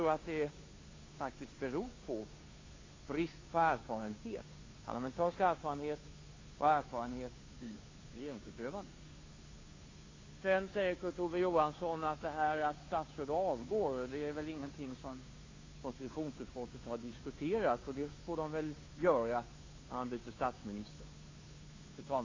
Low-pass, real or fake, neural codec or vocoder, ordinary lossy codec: 7.2 kHz; fake; codec, 16 kHz, 2 kbps, FunCodec, trained on Chinese and English, 25 frames a second; AAC, 32 kbps